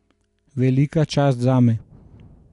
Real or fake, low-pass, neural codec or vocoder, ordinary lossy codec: real; 10.8 kHz; none; none